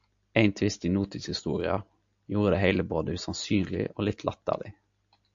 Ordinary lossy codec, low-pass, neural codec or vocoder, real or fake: AAC, 64 kbps; 7.2 kHz; none; real